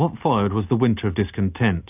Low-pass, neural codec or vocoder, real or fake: 3.6 kHz; none; real